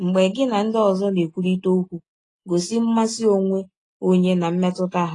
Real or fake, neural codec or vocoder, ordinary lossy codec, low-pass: real; none; AAC, 32 kbps; 10.8 kHz